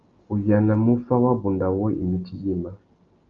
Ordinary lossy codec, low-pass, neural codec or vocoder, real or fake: Opus, 32 kbps; 7.2 kHz; none; real